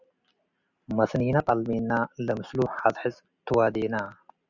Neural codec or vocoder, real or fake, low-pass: none; real; 7.2 kHz